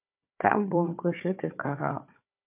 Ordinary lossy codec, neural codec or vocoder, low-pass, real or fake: MP3, 32 kbps; codec, 16 kHz, 4 kbps, FunCodec, trained on Chinese and English, 50 frames a second; 3.6 kHz; fake